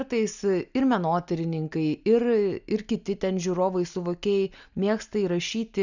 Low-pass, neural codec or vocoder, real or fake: 7.2 kHz; none; real